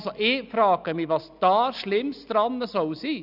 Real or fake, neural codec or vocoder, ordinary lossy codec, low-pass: real; none; none; 5.4 kHz